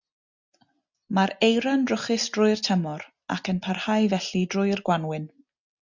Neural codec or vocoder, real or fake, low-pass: none; real; 7.2 kHz